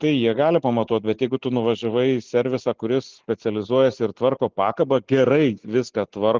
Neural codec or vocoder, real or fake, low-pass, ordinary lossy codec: none; real; 7.2 kHz; Opus, 32 kbps